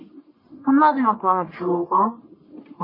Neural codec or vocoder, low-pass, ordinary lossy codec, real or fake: codec, 44.1 kHz, 1.7 kbps, Pupu-Codec; 5.4 kHz; MP3, 24 kbps; fake